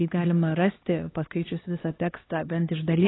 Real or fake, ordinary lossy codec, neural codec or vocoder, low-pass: fake; AAC, 16 kbps; codec, 16 kHz, 2 kbps, X-Codec, HuBERT features, trained on LibriSpeech; 7.2 kHz